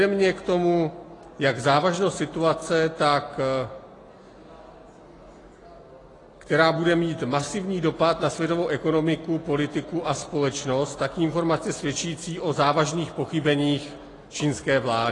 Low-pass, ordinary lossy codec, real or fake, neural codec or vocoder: 10.8 kHz; AAC, 32 kbps; real; none